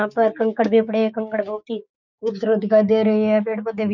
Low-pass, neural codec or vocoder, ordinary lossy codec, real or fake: 7.2 kHz; codec, 16 kHz, 6 kbps, DAC; none; fake